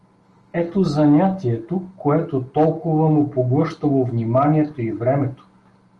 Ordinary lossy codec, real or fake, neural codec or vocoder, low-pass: Opus, 24 kbps; real; none; 10.8 kHz